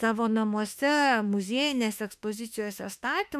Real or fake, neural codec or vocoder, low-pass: fake; autoencoder, 48 kHz, 32 numbers a frame, DAC-VAE, trained on Japanese speech; 14.4 kHz